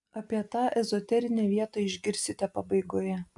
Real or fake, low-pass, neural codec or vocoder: real; 10.8 kHz; none